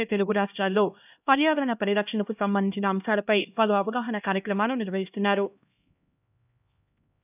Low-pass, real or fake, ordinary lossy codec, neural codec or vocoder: 3.6 kHz; fake; none; codec, 16 kHz, 1 kbps, X-Codec, HuBERT features, trained on LibriSpeech